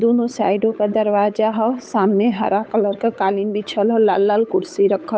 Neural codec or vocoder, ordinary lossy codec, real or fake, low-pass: codec, 16 kHz, 8 kbps, FunCodec, trained on Chinese and English, 25 frames a second; none; fake; none